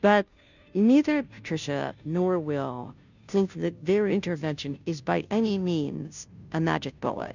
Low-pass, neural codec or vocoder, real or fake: 7.2 kHz; codec, 16 kHz, 0.5 kbps, FunCodec, trained on Chinese and English, 25 frames a second; fake